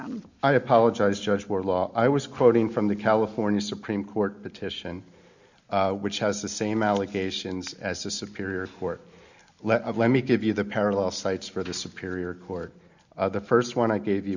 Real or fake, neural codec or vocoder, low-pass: real; none; 7.2 kHz